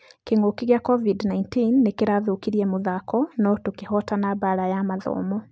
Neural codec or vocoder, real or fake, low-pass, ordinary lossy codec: none; real; none; none